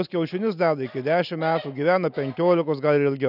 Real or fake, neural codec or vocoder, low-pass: real; none; 5.4 kHz